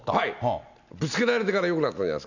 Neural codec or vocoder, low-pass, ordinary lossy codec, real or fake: none; 7.2 kHz; none; real